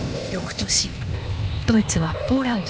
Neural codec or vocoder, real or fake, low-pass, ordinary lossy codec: codec, 16 kHz, 0.8 kbps, ZipCodec; fake; none; none